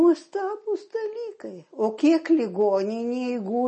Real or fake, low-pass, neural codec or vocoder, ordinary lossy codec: real; 10.8 kHz; none; MP3, 32 kbps